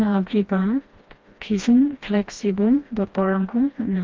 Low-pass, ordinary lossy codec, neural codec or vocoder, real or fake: 7.2 kHz; Opus, 24 kbps; codec, 16 kHz, 1 kbps, FreqCodec, smaller model; fake